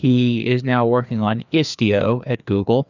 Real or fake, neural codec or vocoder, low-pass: fake; codec, 16 kHz, 2 kbps, FreqCodec, larger model; 7.2 kHz